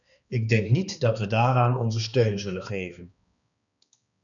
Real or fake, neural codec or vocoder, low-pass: fake; codec, 16 kHz, 2 kbps, X-Codec, HuBERT features, trained on balanced general audio; 7.2 kHz